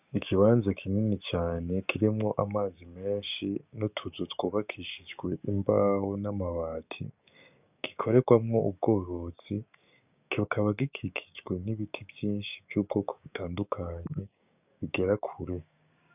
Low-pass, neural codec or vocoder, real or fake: 3.6 kHz; codec, 16 kHz, 6 kbps, DAC; fake